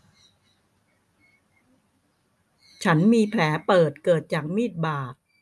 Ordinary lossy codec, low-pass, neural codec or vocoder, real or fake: none; none; none; real